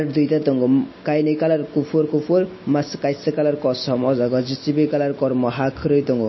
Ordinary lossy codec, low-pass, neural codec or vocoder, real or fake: MP3, 24 kbps; 7.2 kHz; none; real